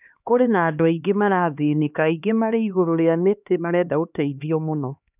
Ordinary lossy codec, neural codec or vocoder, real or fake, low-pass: none; codec, 16 kHz, 2 kbps, X-Codec, HuBERT features, trained on LibriSpeech; fake; 3.6 kHz